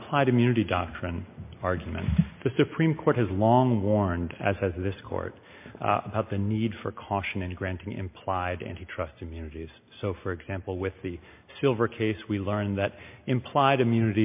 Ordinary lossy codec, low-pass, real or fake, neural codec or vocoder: MP3, 32 kbps; 3.6 kHz; real; none